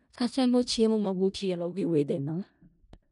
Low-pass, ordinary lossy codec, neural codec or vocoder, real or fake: 10.8 kHz; none; codec, 16 kHz in and 24 kHz out, 0.4 kbps, LongCat-Audio-Codec, four codebook decoder; fake